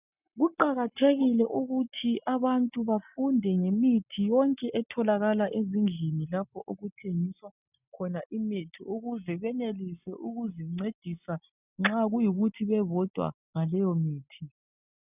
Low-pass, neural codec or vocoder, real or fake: 3.6 kHz; none; real